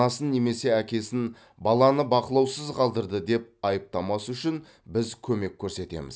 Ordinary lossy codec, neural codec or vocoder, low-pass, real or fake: none; none; none; real